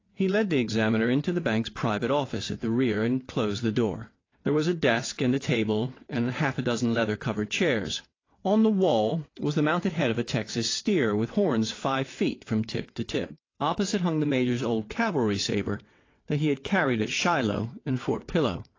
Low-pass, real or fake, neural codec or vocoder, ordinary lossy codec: 7.2 kHz; fake; vocoder, 22.05 kHz, 80 mel bands, WaveNeXt; AAC, 32 kbps